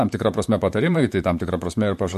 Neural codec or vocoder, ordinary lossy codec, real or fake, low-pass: vocoder, 44.1 kHz, 128 mel bands, Pupu-Vocoder; MP3, 64 kbps; fake; 14.4 kHz